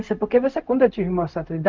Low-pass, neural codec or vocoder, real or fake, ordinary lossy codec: 7.2 kHz; codec, 16 kHz, 0.4 kbps, LongCat-Audio-Codec; fake; Opus, 24 kbps